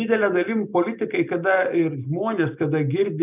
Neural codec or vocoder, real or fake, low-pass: none; real; 3.6 kHz